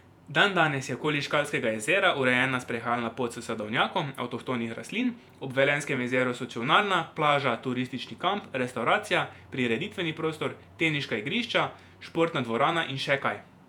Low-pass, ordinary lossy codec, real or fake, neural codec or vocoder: 19.8 kHz; none; fake; vocoder, 48 kHz, 128 mel bands, Vocos